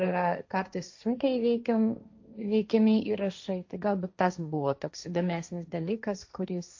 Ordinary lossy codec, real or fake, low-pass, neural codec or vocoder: AAC, 48 kbps; fake; 7.2 kHz; codec, 16 kHz, 1.1 kbps, Voila-Tokenizer